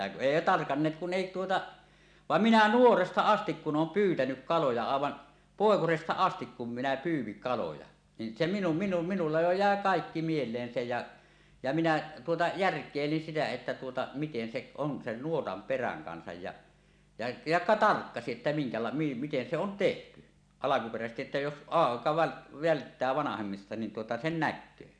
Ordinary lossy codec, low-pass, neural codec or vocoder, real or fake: none; 9.9 kHz; none; real